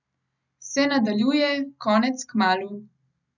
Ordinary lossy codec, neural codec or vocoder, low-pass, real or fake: none; none; 7.2 kHz; real